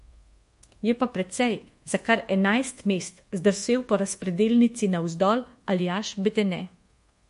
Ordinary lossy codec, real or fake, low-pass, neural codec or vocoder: MP3, 48 kbps; fake; 10.8 kHz; codec, 24 kHz, 1.2 kbps, DualCodec